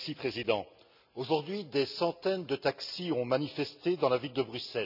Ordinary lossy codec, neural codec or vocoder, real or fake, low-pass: none; none; real; 5.4 kHz